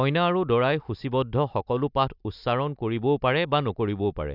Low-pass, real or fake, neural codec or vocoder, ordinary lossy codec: 5.4 kHz; real; none; none